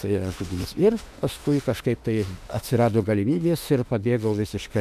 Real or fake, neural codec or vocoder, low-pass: fake; autoencoder, 48 kHz, 32 numbers a frame, DAC-VAE, trained on Japanese speech; 14.4 kHz